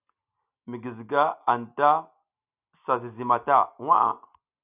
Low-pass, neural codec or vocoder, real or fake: 3.6 kHz; none; real